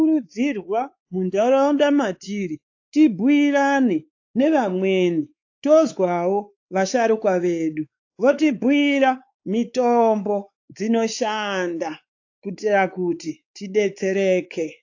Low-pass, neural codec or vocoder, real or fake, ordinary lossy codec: 7.2 kHz; codec, 16 kHz, 4 kbps, X-Codec, WavLM features, trained on Multilingual LibriSpeech; fake; AAC, 48 kbps